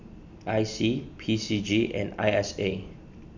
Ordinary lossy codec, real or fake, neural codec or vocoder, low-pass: none; real; none; 7.2 kHz